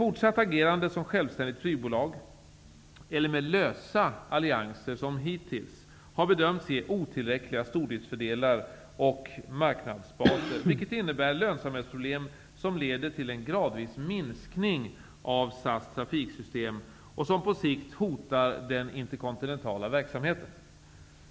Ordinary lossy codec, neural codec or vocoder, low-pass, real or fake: none; none; none; real